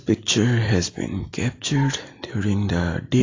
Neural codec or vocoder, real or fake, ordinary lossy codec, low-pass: none; real; AAC, 32 kbps; 7.2 kHz